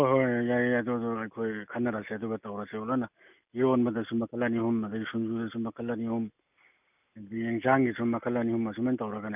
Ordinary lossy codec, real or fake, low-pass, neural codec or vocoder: none; real; 3.6 kHz; none